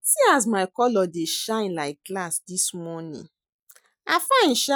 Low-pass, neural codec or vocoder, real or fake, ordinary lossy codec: none; none; real; none